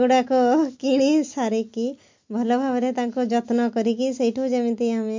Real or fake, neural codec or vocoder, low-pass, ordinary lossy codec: real; none; 7.2 kHz; MP3, 48 kbps